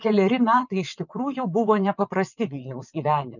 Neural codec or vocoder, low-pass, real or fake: vocoder, 22.05 kHz, 80 mel bands, WaveNeXt; 7.2 kHz; fake